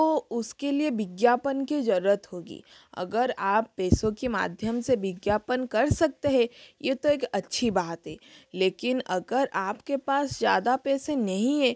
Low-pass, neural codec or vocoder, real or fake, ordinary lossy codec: none; none; real; none